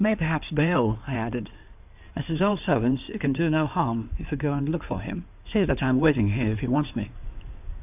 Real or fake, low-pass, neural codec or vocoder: fake; 3.6 kHz; codec, 16 kHz in and 24 kHz out, 2.2 kbps, FireRedTTS-2 codec